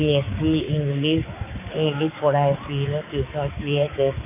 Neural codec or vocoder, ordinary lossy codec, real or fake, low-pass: codec, 16 kHz, 4 kbps, X-Codec, HuBERT features, trained on balanced general audio; AAC, 16 kbps; fake; 3.6 kHz